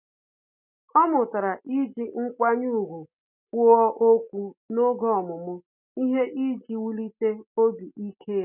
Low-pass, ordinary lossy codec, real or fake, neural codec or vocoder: 3.6 kHz; none; real; none